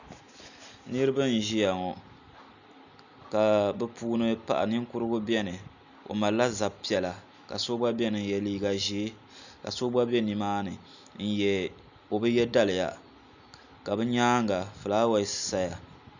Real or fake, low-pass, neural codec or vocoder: real; 7.2 kHz; none